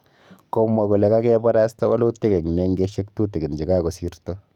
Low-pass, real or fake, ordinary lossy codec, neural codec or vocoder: 19.8 kHz; fake; none; codec, 44.1 kHz, 7.8 kbps, DAC